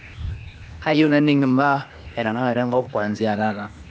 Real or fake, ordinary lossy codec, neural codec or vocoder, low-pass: fake; none; codec, 16 kHz, 0.8 kbps, ZipCodec; none